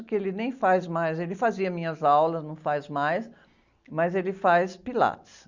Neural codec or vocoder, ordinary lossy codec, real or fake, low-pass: none; Opus, 64 kbps; real; 7.2 kHz